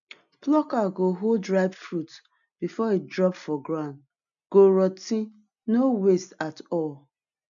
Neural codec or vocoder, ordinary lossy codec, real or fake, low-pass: none; none; real; 7.2 kHz